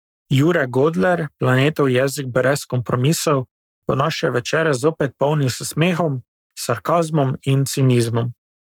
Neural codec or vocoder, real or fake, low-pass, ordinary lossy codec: codec, 44.1 kHz, 7.8 kbps, Pupu-Codec; fake; 19.8 kHz; none